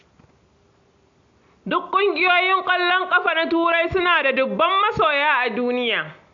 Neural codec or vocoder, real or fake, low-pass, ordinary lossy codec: none; real; 7.2 kHz; AAC, 64 kbps